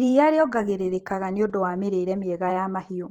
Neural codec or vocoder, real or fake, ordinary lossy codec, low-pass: vocoder, 44.1 kHz, 128 mel bands every 512 samples, BigVGAN v2; fake; Opus, 16 kbps; 19.8 kHz